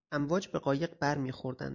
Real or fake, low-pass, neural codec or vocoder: real; 7.2 kHz; none